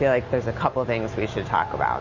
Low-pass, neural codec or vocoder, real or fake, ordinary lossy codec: 7.2 kHz; none; real; MP3, 32 kbps